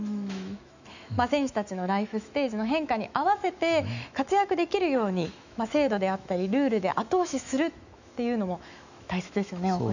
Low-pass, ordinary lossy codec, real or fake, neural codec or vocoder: 7.2 kHz; none; fake; autoencoder, 48 kHz, 128 numbers a frame, DAC-VAE, trained on Japanese speech